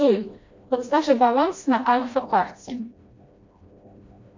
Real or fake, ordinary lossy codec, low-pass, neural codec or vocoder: fake; MP3, 48 kbps; 7.2 kHz; codec, 16 kHz, 1 kbps, FreqCodec, smaller model